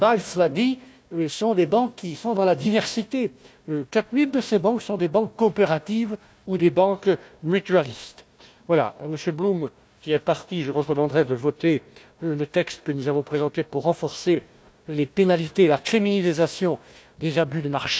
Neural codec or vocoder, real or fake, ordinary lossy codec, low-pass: codec, 16 kHz, 1 kbps, FunCodec, trained on Chinese and English, 50 frames a second; fake; none; none